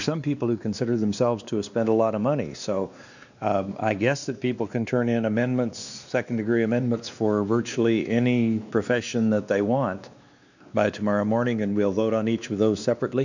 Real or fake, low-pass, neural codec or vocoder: fake; 7.2 kHz; codec, 16 kHz, 2 kbps, X-Codec, WavLM features, trained on Multilingual LibriSpeech